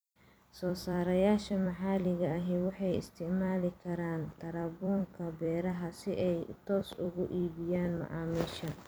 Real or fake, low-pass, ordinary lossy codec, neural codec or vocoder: fake; none; none; vocoder, 44.1 kHz, 128 mel bands every 256 samples, BigVGAN v2